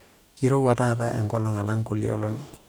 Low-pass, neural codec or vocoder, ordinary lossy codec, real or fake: none; codec, 44.1 kHz, 2.6 kbps, DAC; none; fake